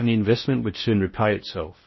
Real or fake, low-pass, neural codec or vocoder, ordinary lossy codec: fake; 7.2 kHz; codec, 16 kHz in and 24 kHz out, 0.6 kbps, FocalCodec, streaming, 4096 codes; MP3, 24 kbps